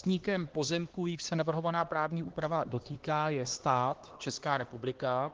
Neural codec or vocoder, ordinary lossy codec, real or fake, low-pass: codec, 16 kHz, 2 kbps, X-Codec, HuBERT features, trained on LibriSpeech; Opus, 16 kbps; fake; 7.2 kHz